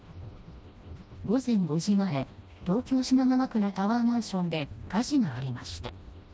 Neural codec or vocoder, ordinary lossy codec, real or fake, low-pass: codec, 16 kHz, 1 kbps, FreqCodec, smaller model; none; fake; none